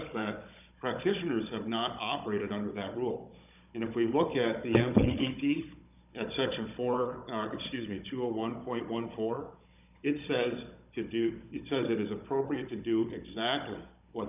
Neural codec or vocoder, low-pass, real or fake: codec, 16 kHz, 16 kbps, FunCodec, trained on Chinese and English, 50 frames a second; 3.6 kHz; fake